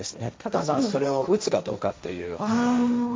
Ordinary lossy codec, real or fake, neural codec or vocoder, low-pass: none; fake; codec, 16 kHz, 1.1 kbps, Voila-Tokenizer; none